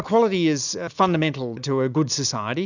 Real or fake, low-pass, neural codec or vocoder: real; 7.2 kHz; none